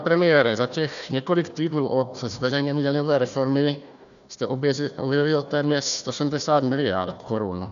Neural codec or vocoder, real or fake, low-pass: codec, 16 kHz, 1 kbps, FunCodec, trained on Chinese and English, 50 frames a second; fake; 7.2 kHz